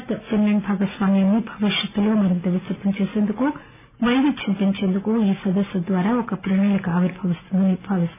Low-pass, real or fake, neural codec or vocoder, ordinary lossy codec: 3.6 kHz; real; none; none